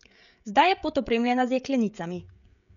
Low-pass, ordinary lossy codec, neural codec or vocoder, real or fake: 7.2 kHz; none; codec, 16 kHz, 16 kbps, FreqCodec, smaller model; fake